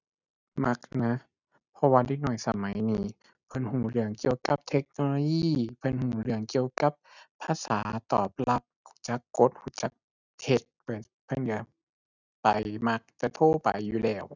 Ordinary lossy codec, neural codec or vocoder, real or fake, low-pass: none; none; real; 7.2 kHz